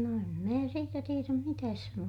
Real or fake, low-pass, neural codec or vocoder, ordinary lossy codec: real; 19.8 kHz; none; none